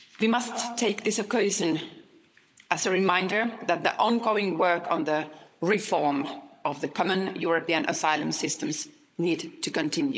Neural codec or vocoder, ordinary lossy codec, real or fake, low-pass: codec, 16 kHz, 16 kbps, FunCodec, trained on LibriTTS, 50 frames a second; none; fake; none